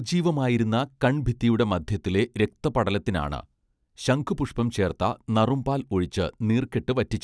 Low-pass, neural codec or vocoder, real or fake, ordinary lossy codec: none; none; real; none